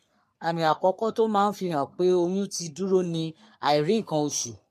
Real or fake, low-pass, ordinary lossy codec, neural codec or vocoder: fake; 14.4 kHz; MP3, 64 kbps; codec, 44.1 kHz, 3.4 kbps, Pupu-Codec